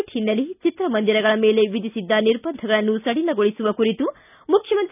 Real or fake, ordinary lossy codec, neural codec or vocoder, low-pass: real; none; none; 3.6 kHz